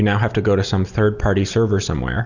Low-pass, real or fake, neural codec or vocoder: 7.2 kHz; real; none